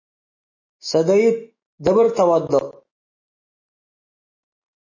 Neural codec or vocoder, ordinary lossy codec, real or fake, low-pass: autoencoder, 48 kHz, 128 numbers a frame, DAC-VAE, trained on Japanese speech; MP3, 32 kbps; fake; 7.2 kHz